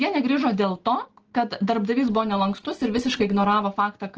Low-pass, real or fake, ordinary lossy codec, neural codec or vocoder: 7.2 kHz; real; Opus, 16 kbps; none